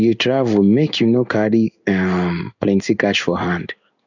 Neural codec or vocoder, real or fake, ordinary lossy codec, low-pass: codec, 16 kHz in and 24 kHz out, 1 kbps, XY-Tokenizer; fake; none; 7.2 kHz